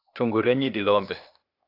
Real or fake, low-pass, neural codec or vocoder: fake; 5.4 kHz; codec, 16 kHz, 0.8 kbps, ZipCodec